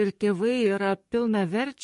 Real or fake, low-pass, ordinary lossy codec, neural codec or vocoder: fake; 14.4 kHz; MP3, 48 kbps; codec, 44.1 kHz, 7.8 kbps, DAC